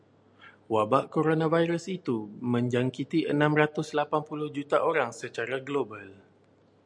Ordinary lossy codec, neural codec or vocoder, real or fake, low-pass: MP3, 96 kbps; none; real; 9.9 kHz